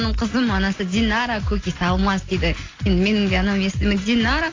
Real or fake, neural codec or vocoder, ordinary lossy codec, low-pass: real; none; AAC, 32 kbps; 7.2 kHz